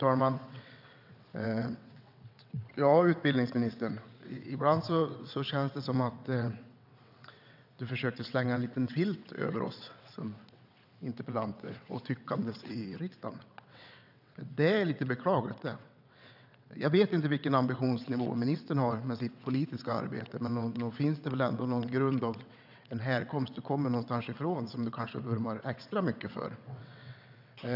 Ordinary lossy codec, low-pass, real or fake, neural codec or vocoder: none; 5.4 kHz; fake; vocoder, 22.05 kHz, 80 mel bands, WaveNeXt